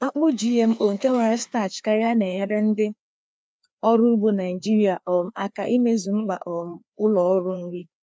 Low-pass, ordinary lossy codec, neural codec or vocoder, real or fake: none; none; codec, 16 kHz, 2 kbps, FreqCodec, larger model; fake